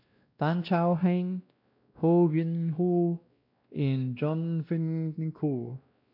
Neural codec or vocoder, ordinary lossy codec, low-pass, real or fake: codec, 16 kHz, 1 kbps, X-Codec, WavLM features, trained on Multilingual LibriSpeech; AAC, 48 kbps; 5.4 kHz; fake